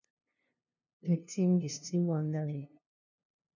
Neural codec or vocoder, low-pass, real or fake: codec, 16 kHz, 0.5 kbps, FunCodec, trained on LibriTTS, 25 frames a second; 7.2 kHz; fake